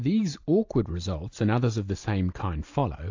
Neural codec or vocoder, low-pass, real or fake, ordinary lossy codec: none; 7.2 kHz; real; AAC, 48 kbps